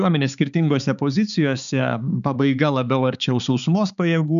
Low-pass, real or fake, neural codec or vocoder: 7.2 kHz; fake; codec, 16 kHz, 4 kbps, X-Codec, HuBERT features, trained on balanced general audio